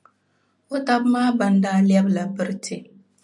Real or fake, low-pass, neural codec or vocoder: real; 10.8 kHz; none